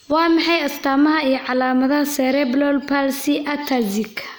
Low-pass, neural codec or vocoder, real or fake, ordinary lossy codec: none; none; real; none